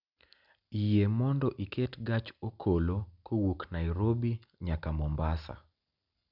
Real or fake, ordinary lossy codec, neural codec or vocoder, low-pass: real; none; none; 5.4 kHz